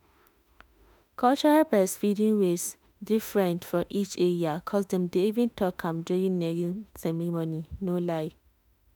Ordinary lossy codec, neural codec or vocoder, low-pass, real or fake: none; autoencoder, 48 kHz, 32 numbers a frame, DAC-VAE, trained on Japanese speech; none; fake